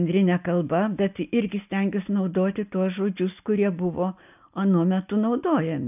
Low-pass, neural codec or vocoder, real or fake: 3.6 kHz; none; real